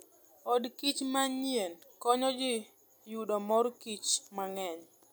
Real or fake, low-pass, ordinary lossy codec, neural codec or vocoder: real; none; none; none